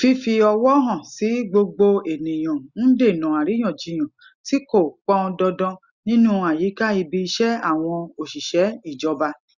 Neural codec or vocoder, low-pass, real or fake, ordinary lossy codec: none; 7.2 kHz; real; Opus, 64 kbps